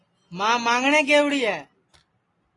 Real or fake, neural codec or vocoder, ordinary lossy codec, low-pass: real; none; AAC, 32 kbps; 10.8 kHz